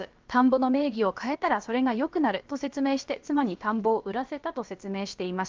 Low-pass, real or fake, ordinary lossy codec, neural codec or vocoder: 7.2 kHz; fake; Opus, 32 kbps; codec, 16 kHz, about 1 kbps, DyCAST, with the encoder's durations